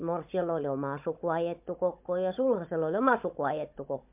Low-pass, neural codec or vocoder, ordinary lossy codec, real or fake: 3.6 kHz; codec, 16 kHz, 16 kbps, FunCodec, trained on Chinese and English, 50 frames a second; MP3, 32 kbps; fake